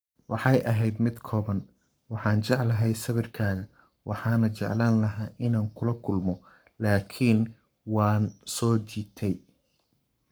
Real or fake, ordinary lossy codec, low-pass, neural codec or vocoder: fake; none; none; codec, 44.1 kHz, 7.8 kbps, Pupu-Codec